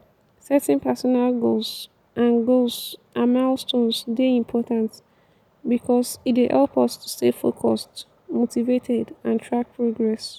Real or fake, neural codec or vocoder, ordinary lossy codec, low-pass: real; none; none; 19.8 kHz